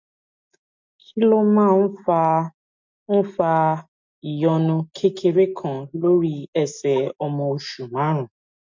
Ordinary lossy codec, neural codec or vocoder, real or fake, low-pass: MP3, 48 kbps; none; real; 7.2 kHz